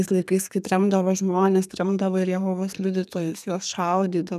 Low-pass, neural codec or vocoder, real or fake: 14.4 kHz; codec, 32 kHz, 1.9 kbps, SNAC; fake